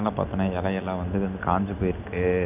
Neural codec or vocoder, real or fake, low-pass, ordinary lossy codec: none; real; 3.6 kHz; none